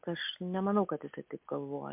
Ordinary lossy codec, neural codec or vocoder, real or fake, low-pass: MP3, 32 kbps; none; real; 3.6 kHz